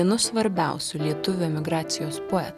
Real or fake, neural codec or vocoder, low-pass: real; none; 14.4 kHz